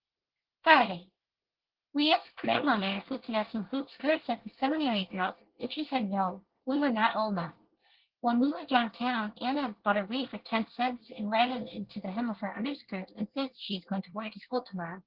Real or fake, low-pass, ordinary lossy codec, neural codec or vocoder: fake; 5.4 kHz; Opus, 16 kbps; codec, 24 kHz, 1 kbps, SNAC